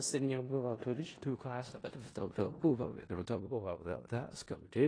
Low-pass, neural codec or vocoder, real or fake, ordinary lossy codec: 9.9 kHz; codec, 16 kHz in and 24 kHz out, 0.4 kbps, LongCat-Audio-Codec, four codebook decoder; fake; AAC, 32 kbps